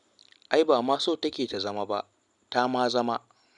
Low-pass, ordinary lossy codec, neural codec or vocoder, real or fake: 10.8 kHz; none; none; real